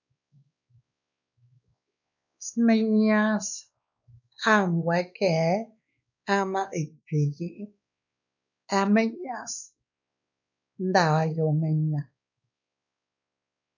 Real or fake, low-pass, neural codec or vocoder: fake; 7.2 kHz; codec, 16 kHz, 2 kbps, X-Codec, WavLM features, trained on Multilingual LibriSpeech